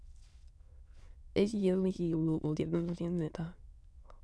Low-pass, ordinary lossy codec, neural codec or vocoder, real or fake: none; none; autoencoder, 22.05 kHz, a latent of 192 numbers a frame, VITS, trained on many speakers; fake